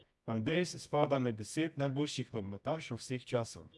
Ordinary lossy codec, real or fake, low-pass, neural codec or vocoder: none; fake; none; codec, 24 kHz, 0.9 kbps, WavTokenizer, medium music audio release